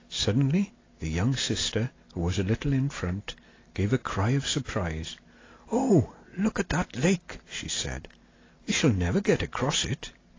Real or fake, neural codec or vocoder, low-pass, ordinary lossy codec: real; none; 7.2 kHz; AAC, 32 kbps